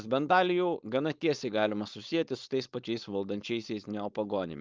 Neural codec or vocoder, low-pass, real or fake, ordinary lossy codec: codec, 16 kHz, 4.8 kbps, FACodec; 7.2 kHz; fake; Opus, 24 kbps